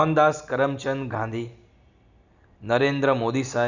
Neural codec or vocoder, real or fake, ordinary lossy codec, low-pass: none; real; none; 7.2 kHz